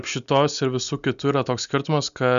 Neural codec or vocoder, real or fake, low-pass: none; real; 7.2 kHz